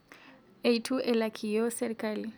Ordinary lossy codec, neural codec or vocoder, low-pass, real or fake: none; none; none; real